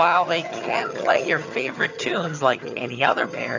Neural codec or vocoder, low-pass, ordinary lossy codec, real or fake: vocoder, 22.05 kHz, 80 mel bands, HiFi-GAN; 7.2 kHz; AAC, 48 kbps; fake